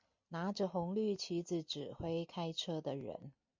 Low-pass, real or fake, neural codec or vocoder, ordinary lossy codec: 7.2 kHz; real; none; MP3, 48 kbps